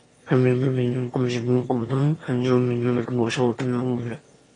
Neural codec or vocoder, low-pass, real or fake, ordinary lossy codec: autoencoder, 22.05 kHz, a latent of 192 numbers a frame, VITS, trained on one speaker; 9.9 kHz; fake; AAC, 32 kbps